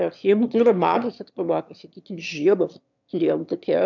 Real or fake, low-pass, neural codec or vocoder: fake; 7.2 kHz; autoencoder, 22.05 kHz, a latent of 192 numbers a frame, VITS, trained on one speaker